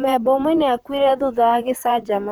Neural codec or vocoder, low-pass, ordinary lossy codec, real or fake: vocoder, 44.1 kHz, 128 mel bands, Pupu-Vocoder; none; none; fake